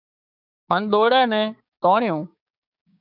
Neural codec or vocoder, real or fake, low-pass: codec, 16 kHz, 6 kbps, DAC; fake; 5.4 kHz